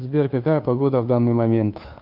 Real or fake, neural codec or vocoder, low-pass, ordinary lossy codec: fake; codec, 16 kHz, 2 kbps, FunCodec, trained on Chinese and English, 25 frames a second; 5.4 kHz; AAC, 48 kbps